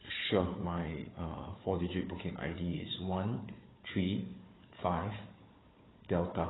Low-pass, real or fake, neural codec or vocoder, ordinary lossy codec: 7.2 kHz; fake; codec, 16 kHz, 4 kbps, FunCodec, trained on Chinese and English, 50 frames a second; AAC, 16 kbps